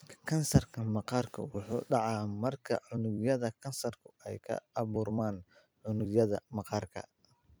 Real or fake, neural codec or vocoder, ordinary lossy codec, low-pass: fake; vocoder, 44.1 kHz, 128 mel bands every 256 samples, BigVGAN v2; none; none